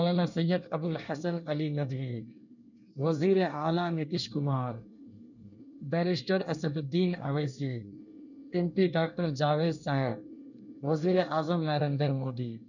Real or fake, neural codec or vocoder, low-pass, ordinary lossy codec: fake; codec, 24 kHz, 1 kbps, SNAC; 7.2 kHz; none